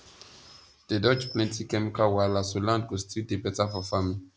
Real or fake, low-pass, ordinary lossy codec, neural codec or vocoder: real; none; none; none